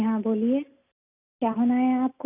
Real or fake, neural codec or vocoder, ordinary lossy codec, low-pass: real; none; none; 3.6 kHz